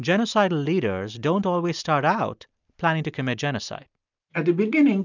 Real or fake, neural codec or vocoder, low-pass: real; none; 7.2 kHz